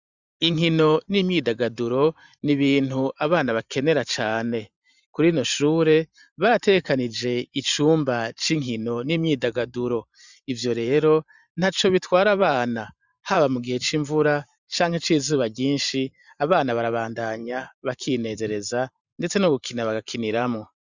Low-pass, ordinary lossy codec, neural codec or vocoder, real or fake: 7.2 kHz; Opus, 64 kbps; vocoder, 44.1 kHz, 128 mel bands every 512 samples, BigVGAN v2; fake